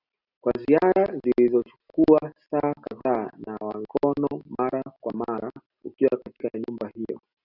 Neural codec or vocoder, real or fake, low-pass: none; real; 5.4 kHz